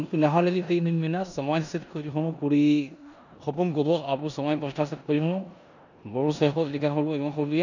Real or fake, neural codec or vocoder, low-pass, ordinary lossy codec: fake; codec, 16 kHz in and 24 kHz out, 0.9 kbps, LongCat-Audio-Codec, four codebook decoder; 7.2 kHz; none